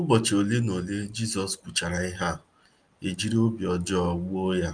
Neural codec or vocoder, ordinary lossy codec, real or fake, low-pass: none; Opus, 32 kbps; real; 9.9 kHz